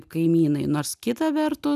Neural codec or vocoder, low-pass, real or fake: none; 14.4 kHz; real